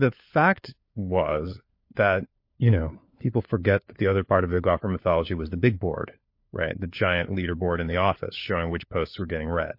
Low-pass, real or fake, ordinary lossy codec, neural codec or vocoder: 5.4 kHz; fake; MP3, 32 kbps; codec, 16 kHz, 4 kbps, FunCodec, trained on LibriTTS, 50 frames a second